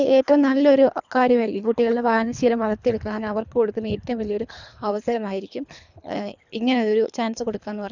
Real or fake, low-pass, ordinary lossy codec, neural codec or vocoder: fake; 7.2 kHz; none; codec, 24 kHz, 3 kbps, HILCodec